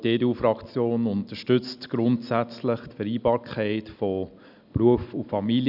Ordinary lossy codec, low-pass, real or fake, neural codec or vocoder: none; 5.4 kHz; real; none